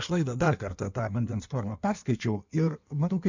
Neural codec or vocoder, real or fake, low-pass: codec, 16 kHz in and 24 kHz out, 1.1 kbps, FireRedTTS-2 codec; fake; 7.2 kHz